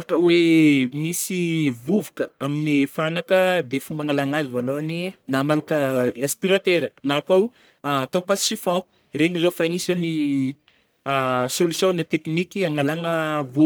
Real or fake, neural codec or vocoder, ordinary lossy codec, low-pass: fake; codec, 44.1 kHz, 1.7 kbps, Pupu-Codec; none; none